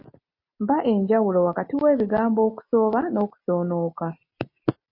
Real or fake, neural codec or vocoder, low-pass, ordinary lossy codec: real; none; 5.4 kHz; MP3, 32 kbps